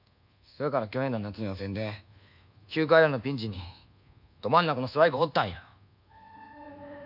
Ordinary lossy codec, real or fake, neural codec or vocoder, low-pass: none; fake; codec, 24 kHz, 1.2 kbps, DualCodec; 5.4 kHz